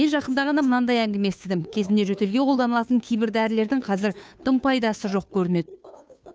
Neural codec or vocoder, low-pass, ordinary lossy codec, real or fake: codec, 16 kHz, 2 kbps, FunCodec, trained on Chinese and English, 25 frames a second; none; none; fake